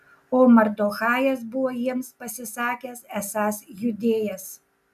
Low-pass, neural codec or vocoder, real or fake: 14.4 kHz; none; real